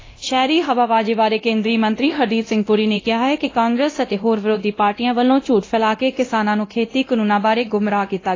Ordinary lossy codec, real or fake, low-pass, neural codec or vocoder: AAC, 32 kbps; fake; 7.2 kHz; codec, 24 kHz, 0.9 kbps, DualCodec